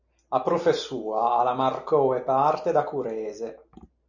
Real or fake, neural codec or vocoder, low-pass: real; none; 7.2 kHz